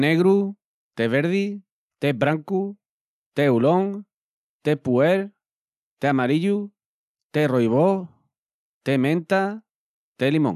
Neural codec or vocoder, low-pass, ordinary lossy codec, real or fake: none; 14.4 kHz; none; real